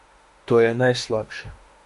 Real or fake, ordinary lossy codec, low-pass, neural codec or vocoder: fake; MP3, 48 kbps; 14.4 kHz; autoencoder, 48 kHz, 32 numbers a frame, DAC-VAE, trained on Japanese speech